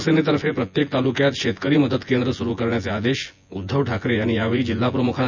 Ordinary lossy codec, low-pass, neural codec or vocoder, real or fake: none; 7.2 kHz; vocoder, 24 kHz, 100 mel bands, Vocos; fake